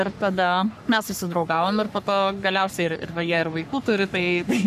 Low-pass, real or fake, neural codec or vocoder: 14.4 kHz; fake; codec, 44.1 kHz, 3.4 kbps, Pupu-Codec